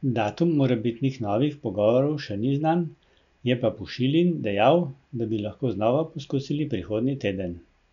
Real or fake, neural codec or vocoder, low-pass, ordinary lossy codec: real; none; 7.2 kHz; none